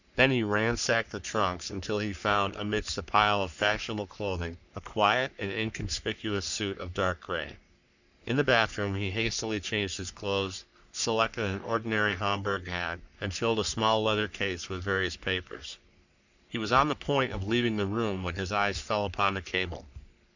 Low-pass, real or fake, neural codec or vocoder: 7.2 kHz; fake; codec, 44.1 kHz, 3.4 kbps, Pupu-Codec